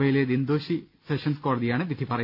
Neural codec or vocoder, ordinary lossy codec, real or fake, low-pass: none; none; real; 5.4 kHz